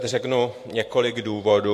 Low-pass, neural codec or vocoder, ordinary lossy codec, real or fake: 14.4 kHz; vocoder, 44.1 kHz, 128 mel bands every 512 samples, BigVGAN v2; AAC, 64 kbps; fake